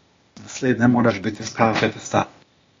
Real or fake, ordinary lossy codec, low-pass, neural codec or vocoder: fake; AAC, 32 kbps; 7.2 kHz; codec, 16 kHz, 0.8 kbps, ZipCodec